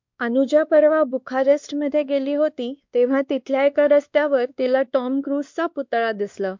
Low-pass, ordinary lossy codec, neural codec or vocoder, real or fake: 7.2 kHz; MP3, 64 kbps; codec, 16 kHz, 2 kbps, X-Codec, WavLM features, trained on Multilingual LibriSpeech; fake